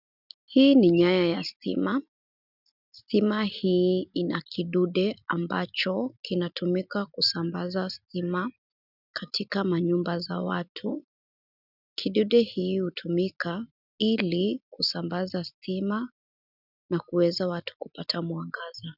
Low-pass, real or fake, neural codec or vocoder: 5.4 kHz; real; none